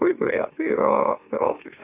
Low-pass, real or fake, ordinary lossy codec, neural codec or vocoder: 3.6 kHz; fake; AAC, 24 kbps; autoencoder, 44.1 kHz, a latent of 192 numbers a frame, MeloTTS